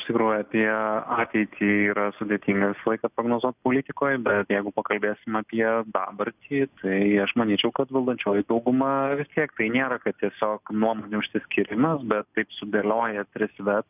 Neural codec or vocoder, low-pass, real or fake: none; 3.6 kHz; real